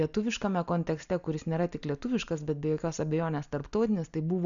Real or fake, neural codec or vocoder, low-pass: real; none; 7.2 kHz